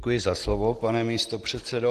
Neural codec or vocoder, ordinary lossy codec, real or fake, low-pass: none; Opus, 16 kbps; real; 14.4 kHz